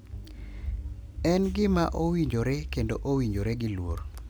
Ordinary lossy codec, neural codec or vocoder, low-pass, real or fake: none; none; none; real